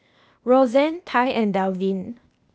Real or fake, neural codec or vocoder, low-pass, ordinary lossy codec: fake; codec, 16 kHz, 0.8 kbps, ZipCodec; none; none